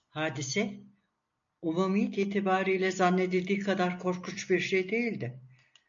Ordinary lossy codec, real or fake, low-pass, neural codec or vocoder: MP3, 64 kbps; real; 7.2 kHz; none